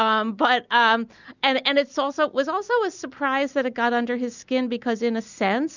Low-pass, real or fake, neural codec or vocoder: 7.2 kHz; real; none